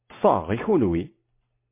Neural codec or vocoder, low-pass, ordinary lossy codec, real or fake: none; 3.6 kHz; MP3, 24 kbps; real